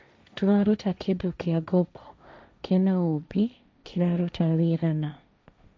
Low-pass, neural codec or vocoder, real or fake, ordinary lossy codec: none; codec, 16 kHz, 1.1 kbps, Voila-Tokenizer; fake; none